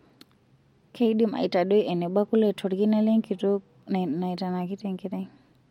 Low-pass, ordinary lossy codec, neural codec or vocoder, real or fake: 19.8 kHz; MP3, 64 kbps; none; real